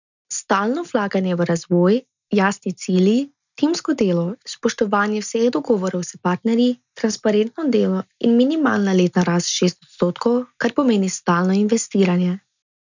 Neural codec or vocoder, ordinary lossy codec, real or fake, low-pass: none; none; real; 7.2 kHz